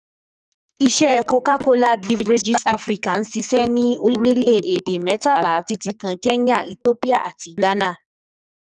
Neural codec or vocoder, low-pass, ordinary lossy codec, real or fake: codec, 44.1 kHz, 2.6 kbps, SNAC; 10.8 kHz; none; fake